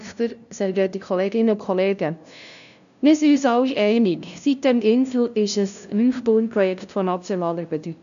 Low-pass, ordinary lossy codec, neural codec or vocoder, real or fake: 7.2 kHz; none; codec, 16 kHz, 0.5 kbps, FunCodec, trained on LibriTTS, 25 frames a second; fake